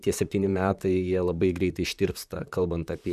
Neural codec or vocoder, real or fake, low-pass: vocoder, 44.1 kHz, 128 mel bands, Pupu-Vocoder; fake; 14.4 kHz